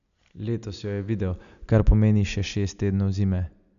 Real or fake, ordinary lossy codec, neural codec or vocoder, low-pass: real; none; none; 7.2 kHz